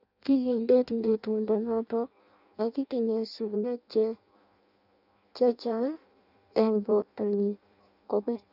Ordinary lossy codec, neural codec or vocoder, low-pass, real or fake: none; codec, 16 kHz in and 24 kHz out, 0.6 kbps, FireRedTTS-2 codec; 5.4 kHz; fake